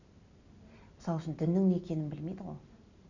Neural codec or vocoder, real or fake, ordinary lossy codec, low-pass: none; real; none; 7.2 kHz